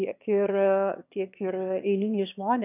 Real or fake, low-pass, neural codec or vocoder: fake; 3.6 kHz; autoencoder, 22.05 kHz, a latent of 192 numbers a frame, VITS, trained on one speaker